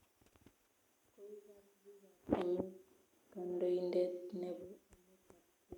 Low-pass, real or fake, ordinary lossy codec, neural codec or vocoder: 19.8 kHz; real; none; none